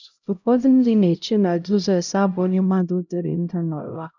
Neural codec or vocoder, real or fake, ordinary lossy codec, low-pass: codec, 16 kHz, 0.5 kbps, X-Codec, HuBERT features, trained on LibriSpeech; fake; none; 7.2 kHz